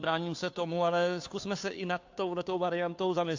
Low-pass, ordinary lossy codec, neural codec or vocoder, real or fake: 7.2 kHz; AAC, 48 kbps; codec, 16 kHz, 2 kbps, FunCodec, trained on Chinese and English, 25 frames a second; fake